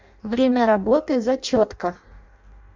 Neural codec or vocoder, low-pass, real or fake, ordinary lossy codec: codec, 16 kHz in and 24 kHz out, 0.6 kbps, FireRedTTS-2 codec; 7.2 kHz; fake; MP3, 64 kbps